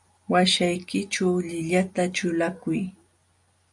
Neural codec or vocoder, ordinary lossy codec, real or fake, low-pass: none; MP3, 96 kbps; real; 10.8 kHz